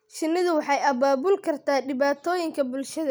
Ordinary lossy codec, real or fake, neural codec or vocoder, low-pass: none; real; none; none